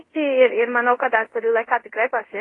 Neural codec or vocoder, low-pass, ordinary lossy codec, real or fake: codec, 24 kHz, 0.5 kbps, DualCodec; 10.8 kHz; AAC, 32 kbps; fake